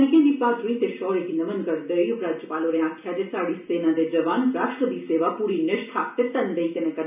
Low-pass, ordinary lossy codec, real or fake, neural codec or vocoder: 3.6 kHz; none; real; none